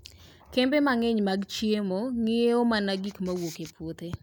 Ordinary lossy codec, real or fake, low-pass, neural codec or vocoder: none; real; none; none